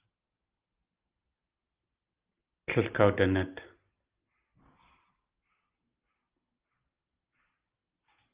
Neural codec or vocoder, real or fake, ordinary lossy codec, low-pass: none; real; Opus, 32 kbps; 3.6 kHz